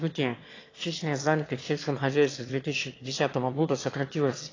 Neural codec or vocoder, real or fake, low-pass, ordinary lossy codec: autoencoder, 22.05 kHz, a latent of 192 numbers a frame, VITS, trained on one speaker; fake; 7.2 kHz; AAC, 32 kbps